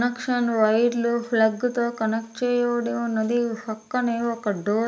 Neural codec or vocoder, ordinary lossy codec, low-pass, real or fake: none; none; none; real